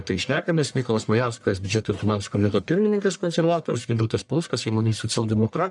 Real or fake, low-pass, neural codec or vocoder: fake; 10.8 kHz; codec, 44.1 kHz, 1.7 kbps, Pupu-Codec